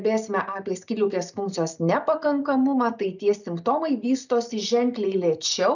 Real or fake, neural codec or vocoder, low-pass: real; none; 7.2 kHz